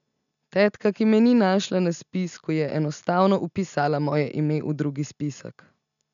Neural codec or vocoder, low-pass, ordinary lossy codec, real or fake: none; 7.2 kHz; none; real